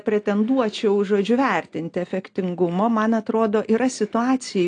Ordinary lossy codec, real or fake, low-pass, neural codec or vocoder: AAC, 48 kbps; real; 9.9 kHz; none